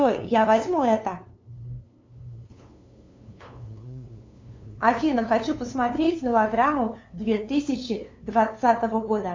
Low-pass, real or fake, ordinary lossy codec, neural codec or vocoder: 7.2 kHz; fake; MP3, 64 kbps; codec, 16 kHz, 2 kbps, FunCodec, trained on LibriTTS, 25 frames a second